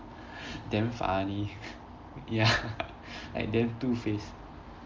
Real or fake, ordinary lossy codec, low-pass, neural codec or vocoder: real; Opus, 32 kbps; 7.2 kHz; none